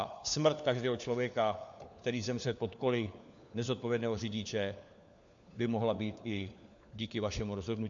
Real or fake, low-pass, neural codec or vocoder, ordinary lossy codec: fake; 7.2 kHz; codec, 16 kHz, 4 kbps, FunCodec, trained on LibriTTS, 50 frames a second; AAC, 64 kbps